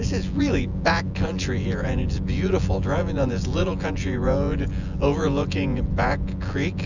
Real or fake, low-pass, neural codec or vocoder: fake; 7.2 kHz; vocoder, 24 kHz, 100 mel bands, Vocos